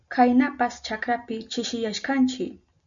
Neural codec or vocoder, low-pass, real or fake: none; 7.2 kHz; real